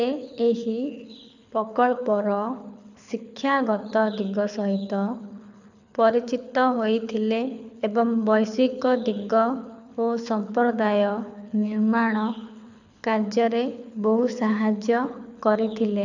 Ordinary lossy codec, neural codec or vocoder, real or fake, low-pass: none; codec, 24 kHz, 6 kbps, HILCodec; fake; 7.2 kHz